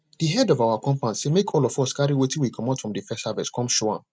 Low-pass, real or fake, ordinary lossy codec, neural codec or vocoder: none; real; none; none